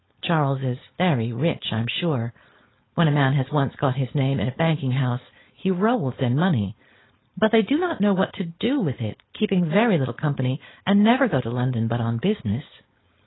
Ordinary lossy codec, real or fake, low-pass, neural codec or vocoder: AAC, 16 kbps; fake; 7.2 kHz; codec, 16 kHz, 4.8 kbps, FACodec